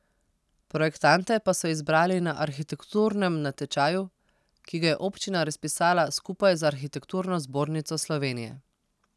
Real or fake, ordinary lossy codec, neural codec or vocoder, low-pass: real; none; none; none